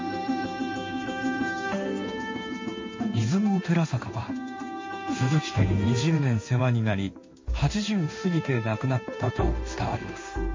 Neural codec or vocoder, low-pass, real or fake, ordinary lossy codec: codec, 16 kHz in and 24 kHz out, 1 kbps, XY-Tokenizer; 7.2 kHz; fake; MP3, 32 kbps